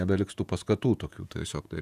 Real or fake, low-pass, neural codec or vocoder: fake; 14.4 kHz; vocoder, 48 kHz, 128 mel bands, Vocos